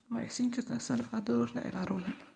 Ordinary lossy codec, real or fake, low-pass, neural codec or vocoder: none; fake; 9.9 kHz; codec, 24 kHz, 0.9 kbps, WavTokenizer, medium speech release version 1